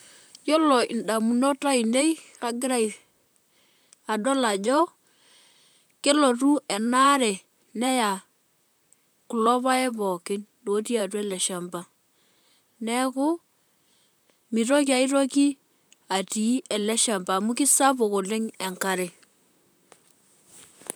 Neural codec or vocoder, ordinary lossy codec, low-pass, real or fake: vocoder, 44.1 kHz, 128 mel bands, Pupu-Vocoder; none; none; fake